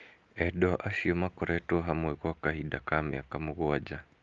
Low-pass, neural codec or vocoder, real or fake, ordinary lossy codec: 7.2 kHz; none; real; Opus, 32 kbps